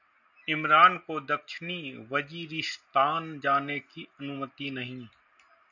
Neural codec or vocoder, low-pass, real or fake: none; 7.2 kHz; real